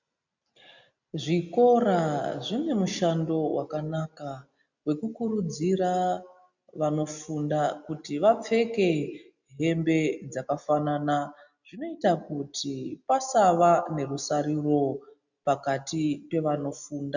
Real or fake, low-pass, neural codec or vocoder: real; 7.2 kHz; none